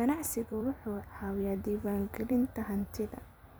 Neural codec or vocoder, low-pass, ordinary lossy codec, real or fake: vocoder, 44.1 kHz, 128 mel bands every 256 samples, BigVGAN v2; none; none; fake